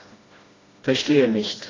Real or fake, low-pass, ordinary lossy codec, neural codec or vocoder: fake; 7.2 kHz; AAC, 32 kbps; codec, 16 kHz, 1 kbps, FreqCodec, smaller model